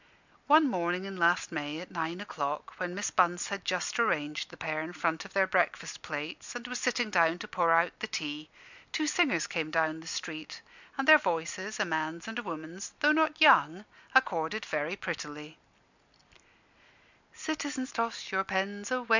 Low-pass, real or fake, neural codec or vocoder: 7.2 kHz; real; none